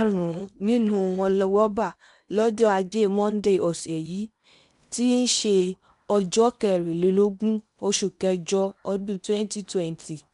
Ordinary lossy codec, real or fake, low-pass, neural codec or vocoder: none; fake; 10.8 kHz; codec, 16 kHz in and 24 kHz out, 0.8 kbps, FocalCodec, streaming, 65536 codes